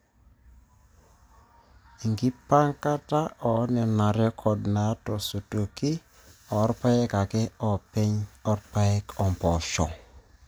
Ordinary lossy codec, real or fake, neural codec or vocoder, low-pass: none; real; none; none